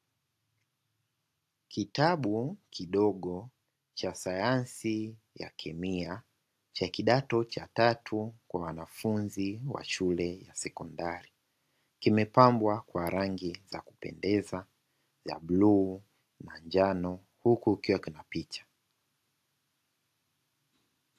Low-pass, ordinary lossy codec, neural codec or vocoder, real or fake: 14.4 kHz; AAC, 64 kbps; none; real